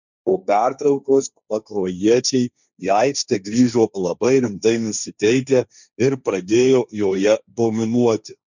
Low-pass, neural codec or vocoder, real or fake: 7.2 kHz; codec, 16 kHz, 1.1 kbps, Voila-Tokenizer; fake